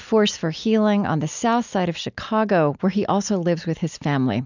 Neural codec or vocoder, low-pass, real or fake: none; 7.2 kHz; real